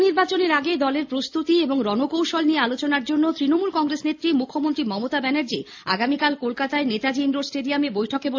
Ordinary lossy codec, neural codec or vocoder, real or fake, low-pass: AAC, 48 kbps; none; real; 7.2 kHz